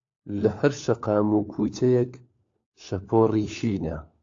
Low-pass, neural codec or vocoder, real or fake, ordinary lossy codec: 7.2 kHz; codec, 16 kHz, 4 kbps, FunCodec, trained on LibriTTS, 50 frames a second; fake; AAC, 48 kbps